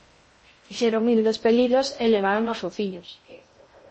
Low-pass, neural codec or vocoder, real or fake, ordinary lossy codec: 10.8 kHz; codec, 16 kHz in and 24 kHz out, 0.6 kbps, FocalCodec, streaming, 2048 codes; fake; MP3, 32 kbps